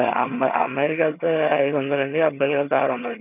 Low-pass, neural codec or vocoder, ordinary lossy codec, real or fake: 3.6 kHz; vocoder, 22.05 kHz, 80 mel bands, HiFi-GAN; none; fake